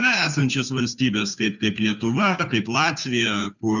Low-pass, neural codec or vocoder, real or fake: 7.2 kHz; codec, 16 kHz, 2 kbps, FunCodec, trained on Chinese and English, 25 frames a second; fake